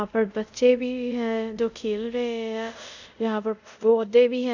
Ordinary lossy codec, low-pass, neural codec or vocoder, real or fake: none; 7.2 kHz; codec, 24 kHz, 0.5 kbps, DualCodec; fake